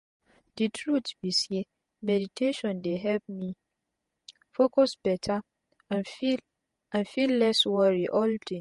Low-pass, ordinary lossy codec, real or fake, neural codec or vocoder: 14.4 kHz; MP3, 48 kbps; fake; vocoder, 48 kHz, 128 mel bands, Vocos